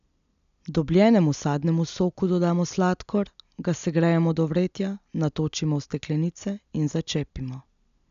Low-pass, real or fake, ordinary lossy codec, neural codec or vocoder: 7.2 kHz; real; none; none